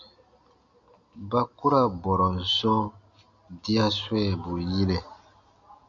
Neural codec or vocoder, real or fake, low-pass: none; real; 7.2 kHz